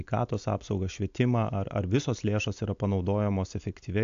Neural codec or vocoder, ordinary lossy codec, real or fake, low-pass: none; AAC, 64 kbps; real; 7.2 kHz